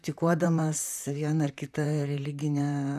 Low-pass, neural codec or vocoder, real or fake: 14.4 kHz; vocoder, 44.1 kHz, 128 mel bands, Pupu-Vocoder; fake